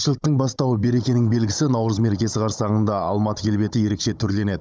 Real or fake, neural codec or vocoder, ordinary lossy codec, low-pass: fake; codec, 16 kHz, 16 kbps, FunCodec, trained on Chinese and English, 50 frames a second; none; none